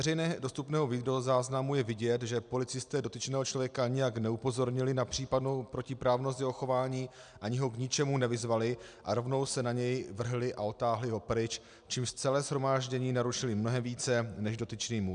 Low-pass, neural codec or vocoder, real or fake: 9.9 kHz; none; real